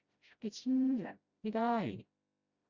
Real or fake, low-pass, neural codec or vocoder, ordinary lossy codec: fake; 7.2 kHz; codec, 16 kHz, 0.5 kbps, FreqCodec, smaller model; Opus, 64 kbps